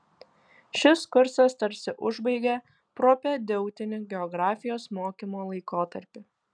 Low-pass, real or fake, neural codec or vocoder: 9.9 kHz; real; none